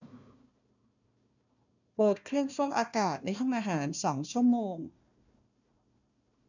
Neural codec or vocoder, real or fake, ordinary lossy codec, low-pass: codec, 16 kHz, 1 kbps, FunCodec, trained on Chinese and English, 50 frames a second; fake; none; 7.2 kHz